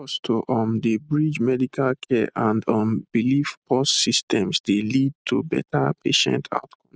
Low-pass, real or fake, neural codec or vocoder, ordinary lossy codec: none; real; none; none